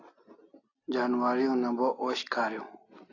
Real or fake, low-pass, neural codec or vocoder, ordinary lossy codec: real; 7.2 kHz; none; AAC, 32 kbps